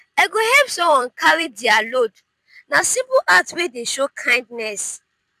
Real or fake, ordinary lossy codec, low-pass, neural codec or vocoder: fake; none; 14.4 kHz; vocoder, 44.1 kHz, 128 mel bands every 256 samples, BigVGAN v2